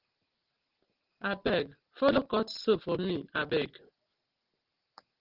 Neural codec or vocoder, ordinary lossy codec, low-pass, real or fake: none; Opus, 16 kbps; 5.4 kHz; real